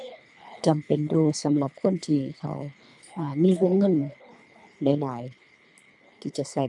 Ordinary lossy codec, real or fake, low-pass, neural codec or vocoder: none; fake; 10.8 kHz; codec, 24 kHz, 3 kbps, HILCodec